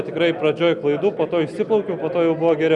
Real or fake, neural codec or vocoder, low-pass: real; none; 10.8 kHz